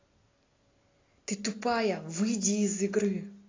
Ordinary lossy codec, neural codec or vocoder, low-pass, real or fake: AAC, 32 kbps; none; 7.2 kHz; real